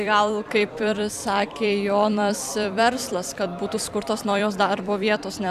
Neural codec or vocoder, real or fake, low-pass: vocoder, 44.1 kHz, 128 mel bands every 256 samples, BigVGAN v2; fake; 14.4 kHz